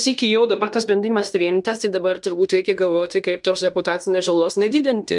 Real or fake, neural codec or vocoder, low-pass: fake; codec, 16 kHz in and 24 kHz out, 0.9 kbps, LongCat-Audio-Codec, fine tuned four codebook decoder; 10.8 kHz